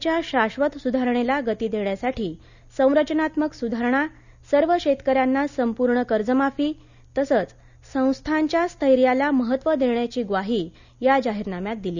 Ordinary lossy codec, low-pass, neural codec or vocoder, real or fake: none; 7.2 kHz; none; real